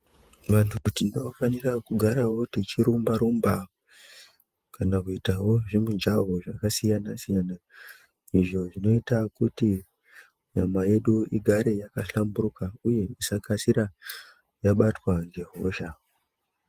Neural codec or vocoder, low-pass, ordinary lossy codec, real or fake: vocoder, 48 kHz, 128 mel bands, Vocos; 14.4 kHz; Opus, 32 kbps; fake